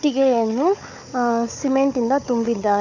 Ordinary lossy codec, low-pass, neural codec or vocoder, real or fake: none; 7.2 kHz; codec, 16 kHz, 4 kbps, FunCodec, trained on Chinese and English, 50 frames a second; fake